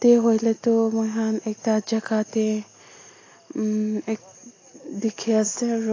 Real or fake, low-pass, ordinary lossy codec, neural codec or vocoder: real; 7.2 kHz; AAC, 32 kbps; none